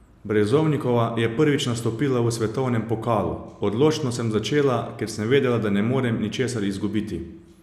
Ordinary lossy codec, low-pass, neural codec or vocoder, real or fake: none; 14.4 kHz; none; real